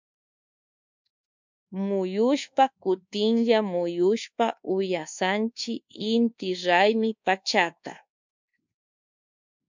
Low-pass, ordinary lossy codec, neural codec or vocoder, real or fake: 7.2 kHz; MP3, 64 kbps; codec, 24 kHz, 1.2 kbps, DualCodec; fake